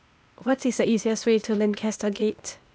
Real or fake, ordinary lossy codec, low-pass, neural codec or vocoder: fake; none; none; codec, 16 kHz, 0.8 kbps, ZipCodec